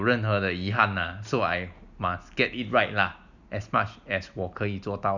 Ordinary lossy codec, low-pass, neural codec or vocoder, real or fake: none; 7.2 kHz; none; real